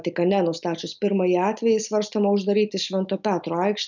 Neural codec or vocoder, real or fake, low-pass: none; real; 7.2 kHz